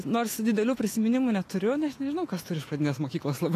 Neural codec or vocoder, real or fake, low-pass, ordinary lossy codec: autoencoder, 48 kHz, 128 numbers a frame, DAC-VAE, trained on Japanese speech; fake; 14.4 kHz; AAC, 48 kbps